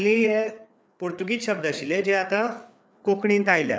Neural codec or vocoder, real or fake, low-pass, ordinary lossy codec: codec, 16 kHz, 4 kbps, FreqCodec, larger model; fake; none; none